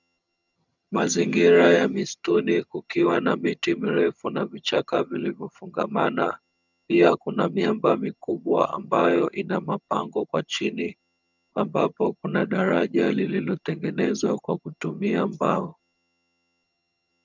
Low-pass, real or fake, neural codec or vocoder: 7.2 kHz; fake; vocoder, 22.05 kHz, 80 mel bands, HiFi-GAN